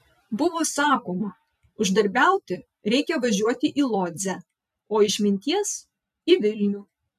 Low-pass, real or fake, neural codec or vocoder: 14.4 kHz; fake; vocoder, 44.1 kHz, 128 mel bands every 256 samples, BigVGAN v2